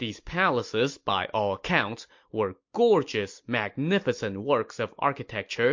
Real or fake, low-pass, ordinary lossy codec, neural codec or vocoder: real; 7.2 kHz; MP3, 48 kbps; none